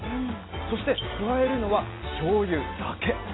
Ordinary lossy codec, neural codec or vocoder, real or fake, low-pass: AAC, 16 kbps; none; real; 7.2 kHz